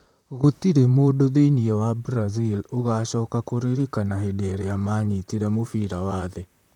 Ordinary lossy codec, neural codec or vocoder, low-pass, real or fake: none; vocoder, 44.1 kHz, 128 mel bands, Pupu-Vocoder; 19.8 kHz; fake